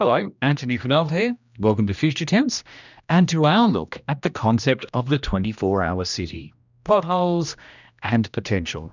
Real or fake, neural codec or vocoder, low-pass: fake; codec, 16 kHz, 1 kbps, X-Codec, HuBERT features, trained on general audio; 7.2 kHz